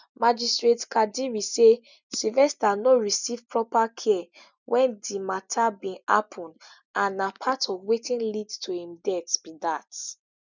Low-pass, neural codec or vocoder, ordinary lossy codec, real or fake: 7.2 kHz; none; none; real